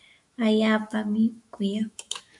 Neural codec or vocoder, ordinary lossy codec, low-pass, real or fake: autoencoder, 48 kHz, 128 numbers a frame, DAC-VAE, trained on Japanese speech; AAC, 64 kbps; 10.8 kHz; fake